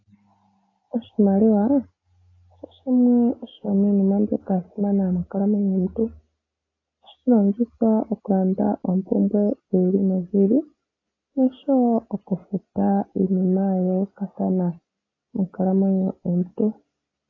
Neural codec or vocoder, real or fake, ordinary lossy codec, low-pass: none; real; AAC, 32 kbps; 7.2 kHz